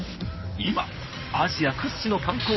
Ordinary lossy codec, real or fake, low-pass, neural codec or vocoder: MP3, 24 kbps; fake; 7.2 kHz; codec, 16 kHz in and 24 kHz out, 2.2 kbps, FireRedTTS-2 codec